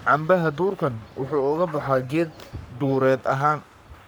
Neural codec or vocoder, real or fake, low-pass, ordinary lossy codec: codec, 44.1 kHz, 3.4 kbps, Pupu-Codec; fake; none; none